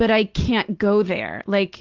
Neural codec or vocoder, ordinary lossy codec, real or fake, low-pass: none; Opus, 16 kbps; real; 7.2 kHz